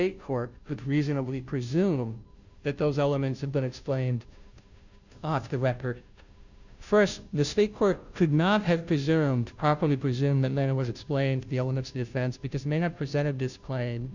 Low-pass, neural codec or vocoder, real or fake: 7.2 kHz; codec, 16 kHz, 0.5 kbps, FunCodec, trained on Chinese and English, 25 frames a second; fake